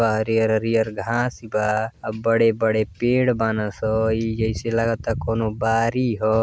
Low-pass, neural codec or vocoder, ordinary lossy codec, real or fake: none; none; none; real